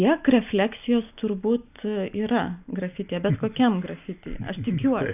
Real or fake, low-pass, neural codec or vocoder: real; 3.6 kHz; none